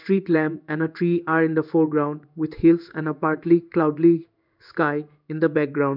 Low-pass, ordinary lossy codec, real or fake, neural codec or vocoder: 5.4 kHz; none; fake; codec, 16 kHz in and 24 kHz out, 1 kbps, XY-Tokenizer